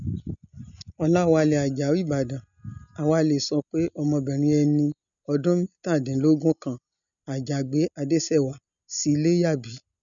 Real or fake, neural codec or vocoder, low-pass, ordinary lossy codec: real; none; 7.2 kHz; none